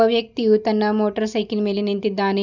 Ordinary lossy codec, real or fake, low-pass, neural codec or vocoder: none; real; 7.2 kHz; none